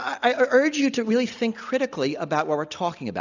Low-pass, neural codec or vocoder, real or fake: 7.2 kHz; none; real